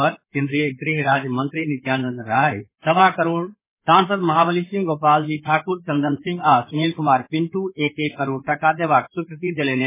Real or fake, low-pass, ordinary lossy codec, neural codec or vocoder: fake; 3.6 kHz; MP3, 16 kbps; codec, 16 kHz in and 24 kHz out, 2.2 kbps, FireRedTTS-2 codec